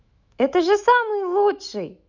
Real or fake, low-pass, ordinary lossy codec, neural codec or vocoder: fake; 7.2 kHz; none; autoencoder, 48 kHz, 128 numbers a frame, DAC-VAE, trained on Japanese speech